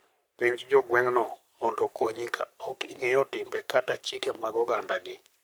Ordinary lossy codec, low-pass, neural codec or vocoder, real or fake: none; none; codec, 44.1 kHz, 3.4 kbps, Pupu-Codec; fake